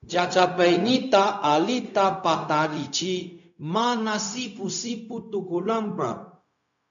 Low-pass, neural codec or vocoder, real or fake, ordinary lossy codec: 7.2 kHz; codec, 16 kHz, 0.4 kbps, LongCat-Audio-Codec; fake; MP3, 96 kbps